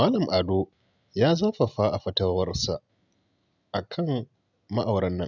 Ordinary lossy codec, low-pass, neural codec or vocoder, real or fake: none; 7.2 kHz; none; real